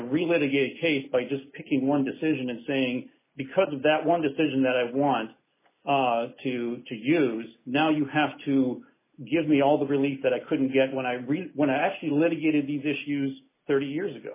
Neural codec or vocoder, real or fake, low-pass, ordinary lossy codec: none; real; 3.6 kHz; MP3, 16 kbps